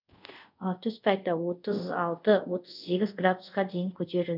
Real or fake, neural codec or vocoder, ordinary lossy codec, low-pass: fake; codec, 24 kHz, 0.5 kbps, DualCodec; none; 5.4 kHz